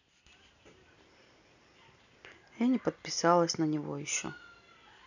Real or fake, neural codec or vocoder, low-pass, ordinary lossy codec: real; none; 7.2 kHz; none